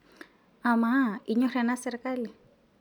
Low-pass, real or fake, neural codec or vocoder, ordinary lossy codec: 19.8 kHz; real; none; none